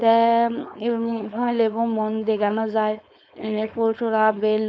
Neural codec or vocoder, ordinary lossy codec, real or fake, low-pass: codec, 16 kHz, 4.8 kbps, FACodec; none; fake; none